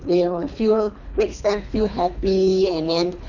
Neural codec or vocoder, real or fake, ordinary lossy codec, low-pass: codec, 24 kHz, 3 kbps, HILCodec; fake; none; 7.2 kHz